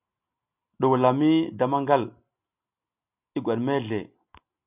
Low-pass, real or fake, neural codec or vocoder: 3.6 kHz; real; none